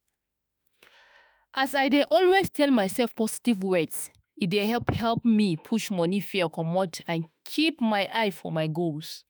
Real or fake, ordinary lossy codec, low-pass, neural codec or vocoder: fake; none; none; autoencoder, 48 kHz, 32 numbers a frame, DAC-VAE, trained on Japanese speech